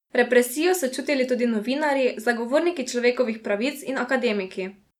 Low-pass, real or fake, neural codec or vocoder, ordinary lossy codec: 19.8 kHz; real; none; none